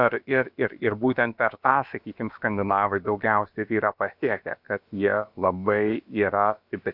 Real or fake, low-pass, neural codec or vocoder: fake; 5.4 kHz; codec, 16 kHz, about 1 kbps, DyCAST, with the encoder's durations